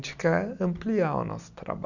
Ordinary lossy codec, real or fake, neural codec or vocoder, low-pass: none; real; none; 7.2 kHz